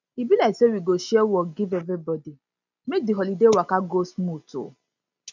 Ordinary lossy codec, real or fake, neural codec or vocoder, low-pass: none; real; none; 7.2 kHz